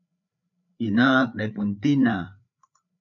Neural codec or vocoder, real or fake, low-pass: codec, 16 kHz, 4 kbps, FreqCodec, larger model; fake; 7.2 kHz